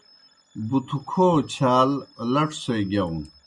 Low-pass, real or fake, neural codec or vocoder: 10.8 kHz; real; none